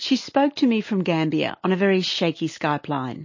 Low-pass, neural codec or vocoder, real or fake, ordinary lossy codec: 7.2 kHz; none; real; MP3, 32 kbps